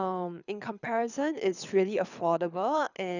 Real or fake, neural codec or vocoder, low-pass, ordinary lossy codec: fake; codec, 24 kHz, 6 kbps, HILCodec; 7.2 kHz; none